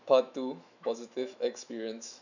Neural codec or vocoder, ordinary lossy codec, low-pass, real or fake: autoencoder, 48 kHz, 128 numbers a frame, DAC-VAE, trained on Japanese speech; none; 7.2 kHz; fake